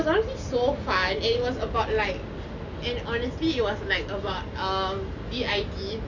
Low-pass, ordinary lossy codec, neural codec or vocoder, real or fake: 7.2 kHz; none; none; real